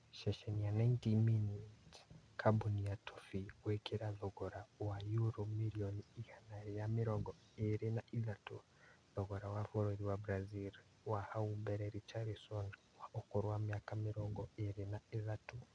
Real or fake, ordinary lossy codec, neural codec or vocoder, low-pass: real; none; none; 9.9 kHz